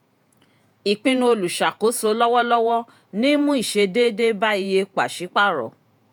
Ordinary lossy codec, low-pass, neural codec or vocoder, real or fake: none; none; vocoder, 48 kHz, 128 mel bands, Vocos; fake